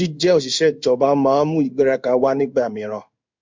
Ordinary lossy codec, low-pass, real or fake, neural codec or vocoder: MP3, 64 kbps; 7.2 kHz; fake; codec, 16 kHz in and 24 kHz out, 1 kbps, XY-Tokenizer